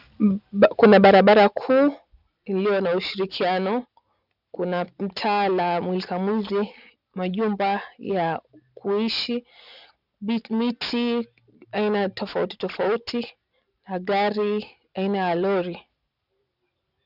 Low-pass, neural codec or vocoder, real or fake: 5.4 kHz; none; real